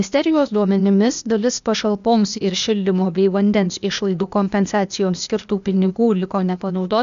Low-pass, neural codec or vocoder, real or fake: 7.2 kHz; codec, 16 kHz, 0.8 kbps, ZipCodec; fake